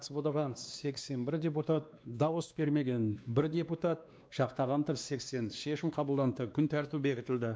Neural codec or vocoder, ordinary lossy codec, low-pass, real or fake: codec, 16 kHz, 2 kbps, X-Codec, WavLM features, trained on Multilingual LibriSpeech; none; none; fake